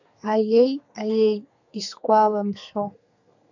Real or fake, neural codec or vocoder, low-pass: fake; codec, 32 kHz, 1.9 kbps, SNAC; 7.2 kHz